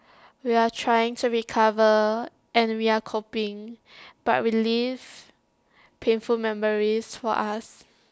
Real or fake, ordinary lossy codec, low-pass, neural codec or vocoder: real; none; none; none